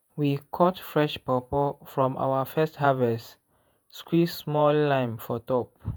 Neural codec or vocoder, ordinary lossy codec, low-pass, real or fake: vocoder, 48 kHz, 128 mel bands, Vocos; none; none; fake